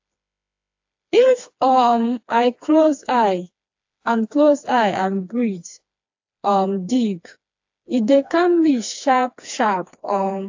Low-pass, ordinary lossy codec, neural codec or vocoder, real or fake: 7.2 kHz; AAC, 48 kbps; codec, 16 kHz, 2 kbps, FreqCodec, smaller model; fake